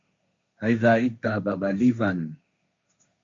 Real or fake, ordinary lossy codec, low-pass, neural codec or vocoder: fake; MP3, 48 kbps; 7.2 kHz; codec, 16 kHz, 1.1 kbps, Voila-Tokenizer